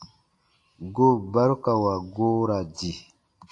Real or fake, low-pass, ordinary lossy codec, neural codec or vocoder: real; 10.8 kHz; AAC, 48 kbps; none